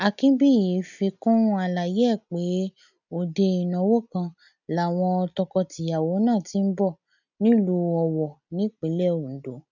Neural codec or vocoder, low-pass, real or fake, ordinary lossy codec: none; 7.2 kHz; real; none